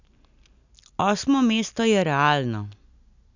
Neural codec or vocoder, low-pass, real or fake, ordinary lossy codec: none; 7.2 kHz; real; none